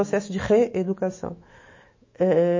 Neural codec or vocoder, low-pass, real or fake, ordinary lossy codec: autoencoder, 48 kHz, 128 numbers a frame, DAC-VAE, trained on Japanese speech; 7.2 kHz; fake; MP3, 32 kbps